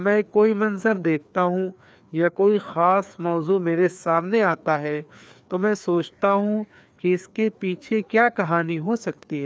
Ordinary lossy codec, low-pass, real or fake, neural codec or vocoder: none; none; fake; codec, 16 kHz, 2 kbps, FreqCodec, larger model